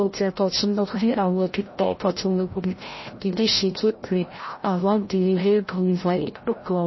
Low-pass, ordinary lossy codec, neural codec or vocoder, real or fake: 7.2 kHz; MP3, 24 kbps; codec, 16 kHz, 0.5 kbps, FreqCodec, larger model; fake